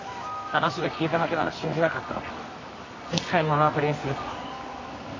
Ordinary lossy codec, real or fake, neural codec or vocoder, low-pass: MP3, 32 kbps; fake; codec, 24 kHz, 0.9 kbps, WavTokenizer, medium music audio release; 7.2 kHz